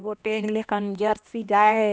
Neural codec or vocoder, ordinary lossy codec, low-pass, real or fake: codec, 16 kHz, 1 kbps, X-Codec, HuBERT features, trained on LibriSpeech; none; none; fake